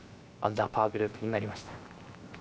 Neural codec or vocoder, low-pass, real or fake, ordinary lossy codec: codec, 16 kHz, 0.7 kbps, FocalCodec; none; fake; none